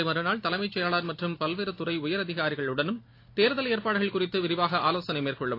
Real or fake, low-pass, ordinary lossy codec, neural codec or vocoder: real; 5.4 kHz; AAC, 32 kbps; none